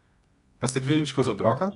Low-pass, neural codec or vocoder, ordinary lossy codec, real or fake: 10.8 kHz; codec, 24 kHz, 0.9 kbps, WavTokenizer, medium music audio release; none; fake